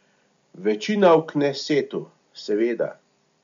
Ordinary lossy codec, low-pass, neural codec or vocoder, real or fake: MP3, 64 kbps; 7.2 kHz; none; real